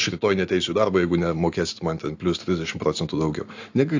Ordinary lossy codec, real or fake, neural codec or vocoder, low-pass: AAC, 48 kbps; real; none; 7.2 kHz